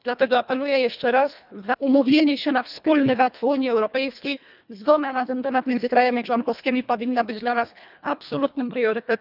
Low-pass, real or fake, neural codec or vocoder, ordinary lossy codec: 5.4 kHz; fake; codec, 24 kHz, 1.5 kbps, HILCodec; none